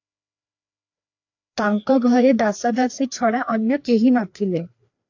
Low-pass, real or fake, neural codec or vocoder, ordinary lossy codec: 7.2 kHz; fake; codec, 16 kHz, 2 kbps, FreqCodec, larger model; AAC, 48 kbps